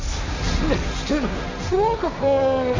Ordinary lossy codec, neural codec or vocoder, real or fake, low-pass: AAC, 48 kbps; codec, 16 kHz, 1.1 kbps, Voila-Tokenizer; fake; 7.2 kHz